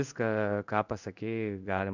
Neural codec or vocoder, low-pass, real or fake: codec, 16 kHz in and 24 kHz out, 1 kbps, XY-Tokenizer; 7.2 kHz; fake